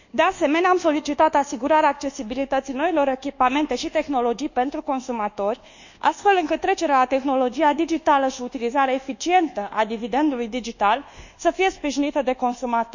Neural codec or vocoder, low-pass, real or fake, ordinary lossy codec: codec, 24 kHz, 1.2 kbps, DualCodec; 7.2 kHz; fake; none